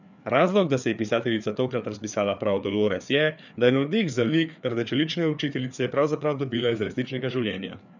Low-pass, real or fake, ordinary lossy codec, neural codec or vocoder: 7.2 kHz; fake; none; codec, 16 kHz, 4 kbps, FreqCodec, larger model